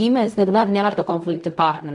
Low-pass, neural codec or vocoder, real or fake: 10.8 kHz; codec, 16 kHz in and 24 kHz out, 0.4 kbps, LongCat-Audio-Codec, fine tuned four codebook decoder; fake